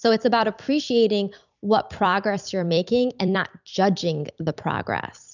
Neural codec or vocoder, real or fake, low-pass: vocoder, 44.1 kHz, 128 mel bands every 256 samples, BigVGAN v2; fake; 7.2 kHz